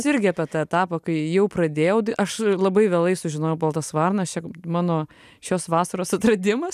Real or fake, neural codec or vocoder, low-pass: real; none; 14.4 kHz